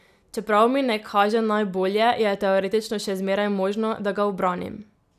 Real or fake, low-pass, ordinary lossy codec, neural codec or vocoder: real; 14.4 kHz; none; none